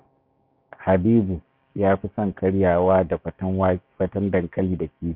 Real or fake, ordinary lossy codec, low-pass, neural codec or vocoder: fake; none; 5.4 kHz; codec, 16 kHz, 6 kbps, DAC